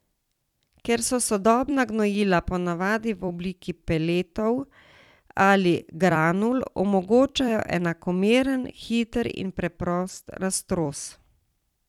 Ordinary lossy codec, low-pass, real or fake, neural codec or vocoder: none; 19.8 kHz; fake; vocoder, 44.1 kHz, 128 mel bands every 256 samples, BigVGAN v2